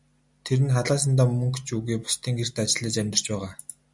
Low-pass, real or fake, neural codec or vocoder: 10.8 kHz; real; none